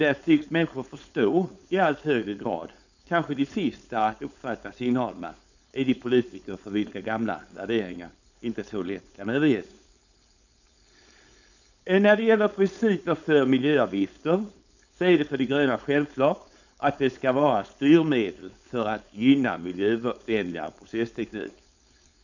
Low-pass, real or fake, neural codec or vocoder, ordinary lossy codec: 7.2 kHz; fake; codec, 16 kHz, 4.8 kbps, FACodec; none